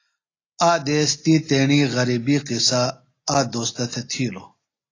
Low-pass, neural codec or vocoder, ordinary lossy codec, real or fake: 7.2 kHz; none; AAC, 32 kbps; real